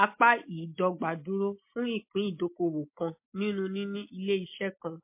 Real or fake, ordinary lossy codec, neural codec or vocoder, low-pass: real; MP3, 24 kbps; none; 3.6 kHz